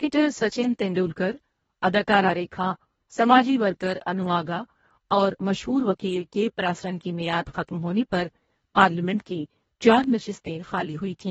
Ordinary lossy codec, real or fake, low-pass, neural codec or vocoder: AAC, 24 kbps; fake; 10.8 kHz; codec, 24 kHz, 1.5 kbps, HILCodec